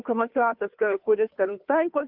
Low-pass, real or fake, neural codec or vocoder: 5.4 kHz; fake; codec, 16 kHz, 2 kbps, FunCodec, trained on Chinese and English, 25 frames a second